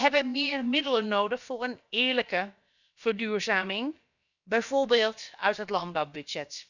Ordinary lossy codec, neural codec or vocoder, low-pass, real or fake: none; codec, 16 kHz, about 1 kbps, DyCAST, with the encoder's durations; 7.2 kHz; fake